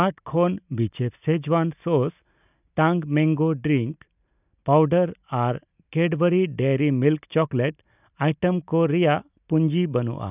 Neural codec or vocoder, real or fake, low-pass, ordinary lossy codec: none; real; 3.6 kHz; none